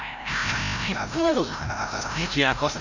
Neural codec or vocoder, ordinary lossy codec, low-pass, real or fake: codec, 16 kHz, 0.5 kbps, FreqCodec, larger model; none; 7.2 kHz; fake